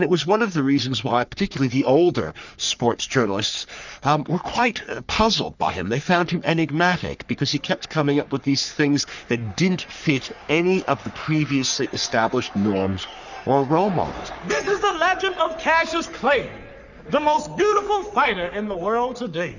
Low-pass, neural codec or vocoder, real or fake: 7.2 kHz; codec, 44.1 kHz, 3.4 kbps, Pupu-Codec; fake